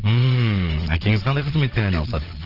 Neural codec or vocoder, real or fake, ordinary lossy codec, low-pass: vocoder, 22.05 kHz, 80 mel bands, Vocos; fake; Opus, 16 kbps; 5.4 kHz